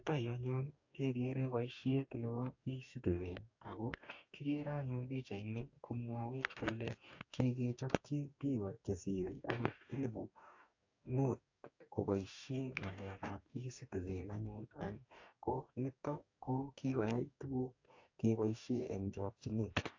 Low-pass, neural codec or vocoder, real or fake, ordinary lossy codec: 7.2 kHz; codec, 44.1 kHz, 2.6 kbps, DAC; fake; AAC, 48 kbps